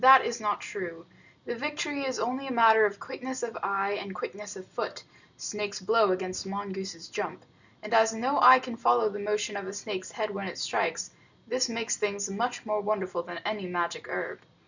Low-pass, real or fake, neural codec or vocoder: 7.2 kHz; real; none